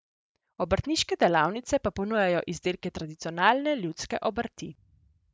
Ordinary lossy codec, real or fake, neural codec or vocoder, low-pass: none; real; none; none